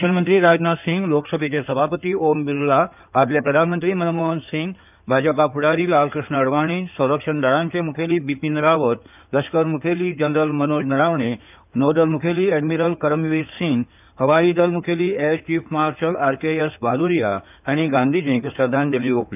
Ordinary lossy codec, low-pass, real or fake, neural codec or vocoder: none; 3.6 kHz; fake; codec, 16 kHz in and 24 kHz out, 2.2 kbps, FireRedTTS-2 codec